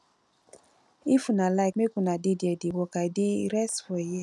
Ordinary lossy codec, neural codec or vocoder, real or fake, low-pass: none; none; real; none